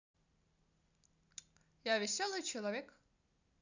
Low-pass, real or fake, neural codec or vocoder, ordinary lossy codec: 7.2 kHz; real; none; none